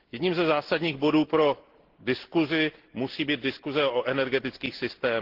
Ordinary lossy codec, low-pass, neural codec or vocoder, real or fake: Opus, 16 kbps; 5.4 kHz; none; real